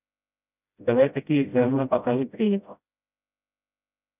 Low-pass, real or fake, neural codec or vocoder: 3.6 kHz; fake; codec, 16 kHz, 0.5 kbps, FreqCodec, smaller model